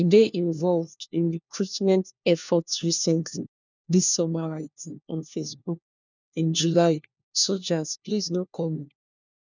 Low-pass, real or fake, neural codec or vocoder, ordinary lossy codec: 7.2 kHz; fake; codec, 16 kHz, 1 kbps, FunCodec, trained on LibriTTS, 50 frames a second; none